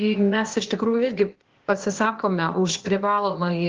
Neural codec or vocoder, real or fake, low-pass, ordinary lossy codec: codec, 16 kHz, 0.8 kbps, ZipCodec; fake; 7.2 kHz; Opus, 16 kbps